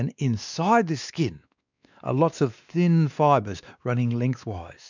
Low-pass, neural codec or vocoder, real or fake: 7.2 kHz; codec, 16 kHz, 2 kbps, X-Codec, WavLM features, trained on Multilingual LibriSpeech; fake